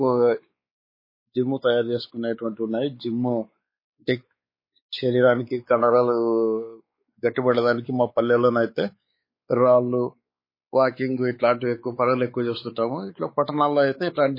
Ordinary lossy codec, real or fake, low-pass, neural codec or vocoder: MP3, 24 kbps; fake; 5.4 kHz; codec, 16 kHz, 4 kbps, X-Codec, WavLM features, trained on Multilingual LibriSpeech